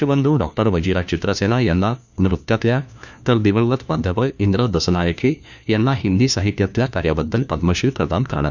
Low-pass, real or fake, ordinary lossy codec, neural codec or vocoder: 7.2 kHz; fake; none; codec, 16 kHz, 1 kbps, FunCodec, trained on LibriTTS, 50 frames a second